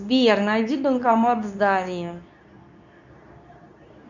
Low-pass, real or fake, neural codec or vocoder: 7.2 kHz; fake; codec, 24 kHz, 0.9 kbps, WavTokenizer, medium speech release version 2